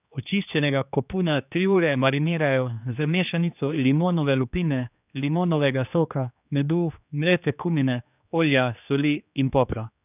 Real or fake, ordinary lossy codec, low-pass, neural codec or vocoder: fake; none; 3.6 kHz; codec, 16 kHz, 2 kbps, X-Codec, HuBERT features, trained on general audio